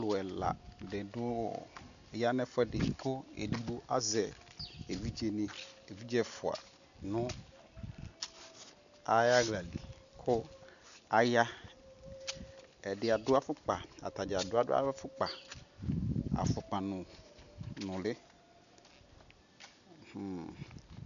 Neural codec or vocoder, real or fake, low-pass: none; real; 7.2 kHz